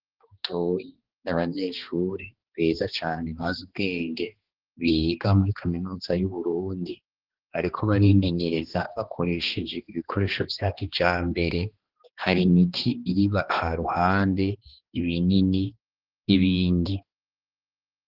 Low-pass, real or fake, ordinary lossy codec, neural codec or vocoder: 5.4 kHz; fake; Opus, 16 kbps; codec, 16 kHz, 2 kbps, X-Codec, HuBERT features, trained on balanced general audio